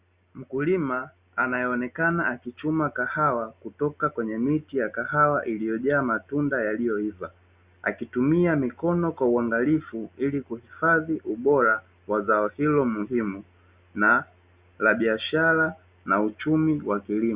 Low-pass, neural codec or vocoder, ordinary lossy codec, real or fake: 3.6 kHz; none; Opus, 64 kbps; real